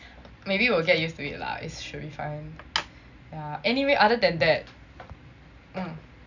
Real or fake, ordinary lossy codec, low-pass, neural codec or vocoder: real; none; 7.2 kHz; none